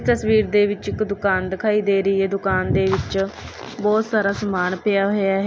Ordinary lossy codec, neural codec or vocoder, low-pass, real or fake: none; none; none; real